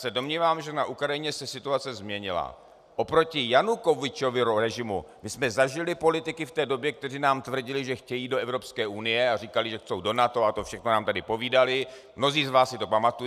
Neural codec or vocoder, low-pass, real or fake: none; 14.4 kHz; real